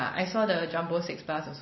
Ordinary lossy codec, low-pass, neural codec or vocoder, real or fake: MP3, 24 kbps; 7.2 kHz; none; real